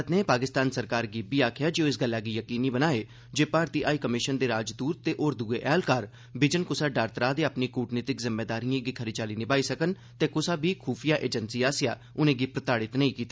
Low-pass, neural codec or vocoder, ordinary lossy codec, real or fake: none; none; none; real